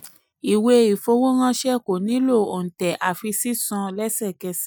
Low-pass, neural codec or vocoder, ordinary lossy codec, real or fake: none; none; none; real